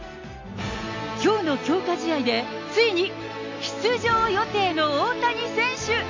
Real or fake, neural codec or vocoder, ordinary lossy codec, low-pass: real; none; AAC, 48 kbps; 7.2 kHz